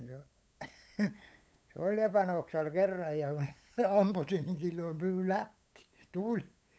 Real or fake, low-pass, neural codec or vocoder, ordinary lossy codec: fake; none; codec, 16 kHz, 8 kbps, FunCodec, trained on LibriTTS, 25 frames a second; none